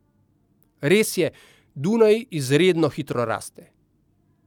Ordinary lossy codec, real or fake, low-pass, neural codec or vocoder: none; real; 19.8 kHz; none